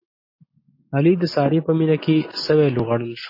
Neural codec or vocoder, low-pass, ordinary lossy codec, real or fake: none; 5.4 kHz; MP3, 24 kbps; real